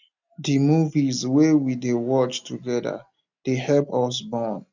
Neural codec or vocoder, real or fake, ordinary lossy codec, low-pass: none; real; none; 7.2 kHz